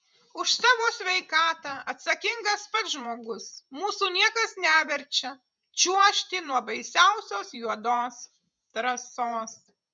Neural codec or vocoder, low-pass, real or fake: vocoder, 48 kHz, 128 mel bands, Vocos; 9.9 kHz; fake